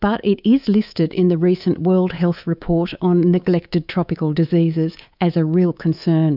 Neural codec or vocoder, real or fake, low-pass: codec, 24 kHz, 3.1 kbps, DualCodec; fake; 5.4 kHz